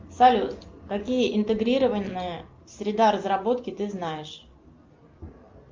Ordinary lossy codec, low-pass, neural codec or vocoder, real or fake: Opus, 24 kbps; 7.2 kHz; none; real